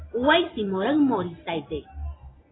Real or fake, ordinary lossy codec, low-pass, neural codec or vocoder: real; AAC, 16 kbps; 7.2 kHz; none